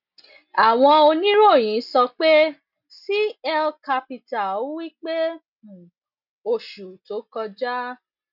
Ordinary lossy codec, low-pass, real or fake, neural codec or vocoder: none; 5.4 kHz; real; none